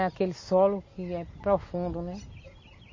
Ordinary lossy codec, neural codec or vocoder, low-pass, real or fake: MP3, 32 kbps; none; 7.2 kHz; real